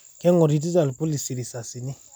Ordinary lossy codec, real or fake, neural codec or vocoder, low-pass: none; real; none; none